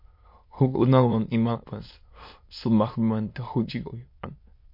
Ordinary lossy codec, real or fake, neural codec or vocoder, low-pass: MP3, 32 kbps; fake; autoencoder, 22.05 kHz, a latent of 192 numbers a frame, VITS, trained on many speakers; 5.4 kHz